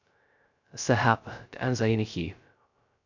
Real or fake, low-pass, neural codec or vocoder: fake; 7.2 kHz; codec, 16 kHz, 0.2 kbps, FocalCodec